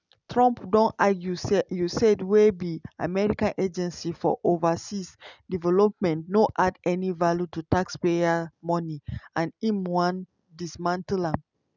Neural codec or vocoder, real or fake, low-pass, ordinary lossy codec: none; real; 7.2 kHz; none